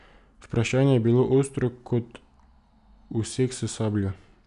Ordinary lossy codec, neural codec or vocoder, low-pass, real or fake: none; none; 10.8 kHz; real